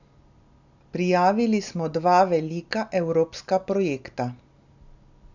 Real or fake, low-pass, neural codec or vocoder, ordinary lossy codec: real; 7.2 kHz; none; none